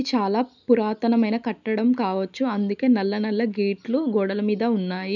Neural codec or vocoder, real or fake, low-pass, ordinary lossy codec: none; real; 7.2 kHz; none